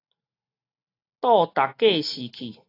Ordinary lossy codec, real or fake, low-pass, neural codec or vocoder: AAC, 32 kbps; real; 5.4 kHz; none